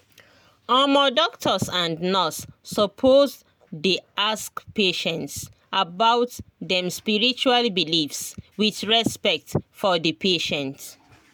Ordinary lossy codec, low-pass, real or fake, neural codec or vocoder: none; none; real; none